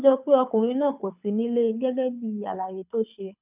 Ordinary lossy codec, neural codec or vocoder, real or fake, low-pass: none; codec, 24 kHz, 6 kbps, HILCodec; fake; 3.6 kHz